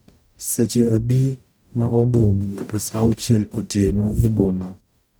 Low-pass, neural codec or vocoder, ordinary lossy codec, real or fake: none; codec, 44.1 kHz, 0.9 kbps, DAC; none; fake